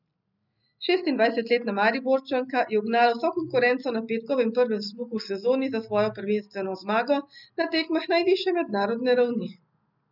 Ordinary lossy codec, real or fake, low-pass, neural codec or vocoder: none; real; 5.4 kHz; none